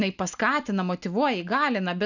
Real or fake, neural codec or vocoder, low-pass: real; none; 7.2 kHz